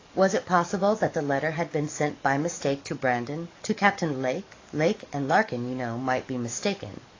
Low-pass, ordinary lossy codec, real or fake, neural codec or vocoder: 7.2 kHz; AAC, 32 kbps; fake; codec, 44.1 kHz, 7.8 kbps, DAC